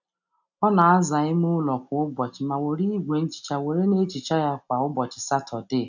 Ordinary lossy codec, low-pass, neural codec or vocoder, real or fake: none; 7.2 kHz; none; real